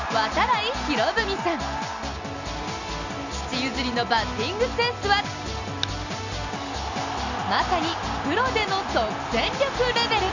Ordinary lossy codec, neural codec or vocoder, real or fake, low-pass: none; none; real; 7.2 kHz